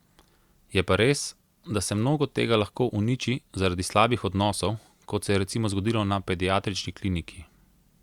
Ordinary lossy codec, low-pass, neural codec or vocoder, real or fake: Opus, 64 kbps; 19.8 kHz; none; real